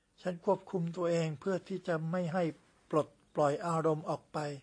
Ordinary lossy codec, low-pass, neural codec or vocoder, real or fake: AAC, 48 kbps; 9.9 kHz; none; real